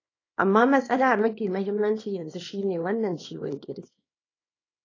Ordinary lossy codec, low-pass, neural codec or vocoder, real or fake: AAC, 32 kbps; 7.2 kHz; codec, 24 kHz, 0.9 kbps, WavTokenizer, small release; fake